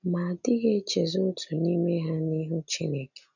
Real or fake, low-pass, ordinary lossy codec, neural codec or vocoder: real; 7.2 kHz; none; none